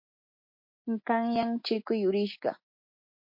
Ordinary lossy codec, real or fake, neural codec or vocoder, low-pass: MP3, 32 kbps; real; none; 5.4 kHz